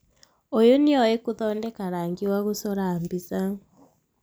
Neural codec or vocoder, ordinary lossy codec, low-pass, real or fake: none; none; none; real